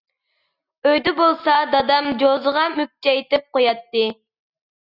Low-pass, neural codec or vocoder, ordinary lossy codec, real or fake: 5.4 kHz; none; Opus, 64 kbps; real